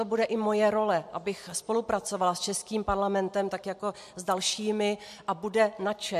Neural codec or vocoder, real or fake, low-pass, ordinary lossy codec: none; real; 14.4 kHz; MP3, 64 kbps